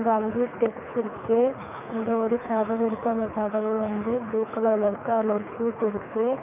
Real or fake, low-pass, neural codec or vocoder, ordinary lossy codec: fake; 3.6 kHz; codec, 24 kHz, 3 kbps, HILCodec; none